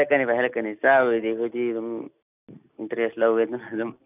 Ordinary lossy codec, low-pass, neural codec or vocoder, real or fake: none; 3.6 kHz; none; real